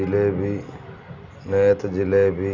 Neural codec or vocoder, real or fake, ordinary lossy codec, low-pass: none; real; none; 7.2 kHz